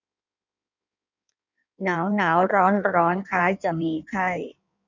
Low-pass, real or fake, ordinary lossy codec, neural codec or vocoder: 7.2 kHz; fake; none; codec, 16 kHz in and 24 kHz out, 1.1 kbps, FireRedTTS-2 codec